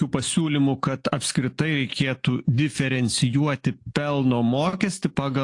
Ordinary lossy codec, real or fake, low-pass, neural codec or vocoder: AAC, 48 kbps; real; 10.8 kHz; none